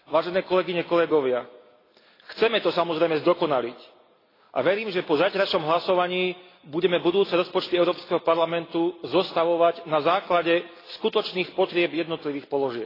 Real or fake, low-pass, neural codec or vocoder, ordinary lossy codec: real; 5.4 kHz; none; AAC, 24 kbps